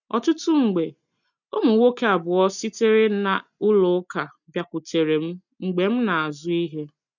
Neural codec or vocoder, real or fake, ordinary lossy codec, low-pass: none; real; none; 7.2 kHz